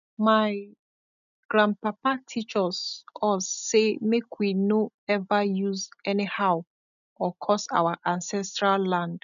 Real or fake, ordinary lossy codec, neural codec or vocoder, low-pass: real; none; none; 7.2 kHz